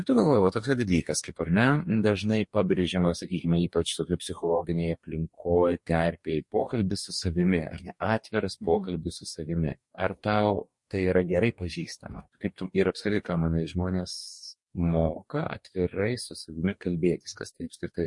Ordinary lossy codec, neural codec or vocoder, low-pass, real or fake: MP3, 48 kbps; codec, 44.1 kHz, 2.6 kbps, DAC; 10.8 kHz; fake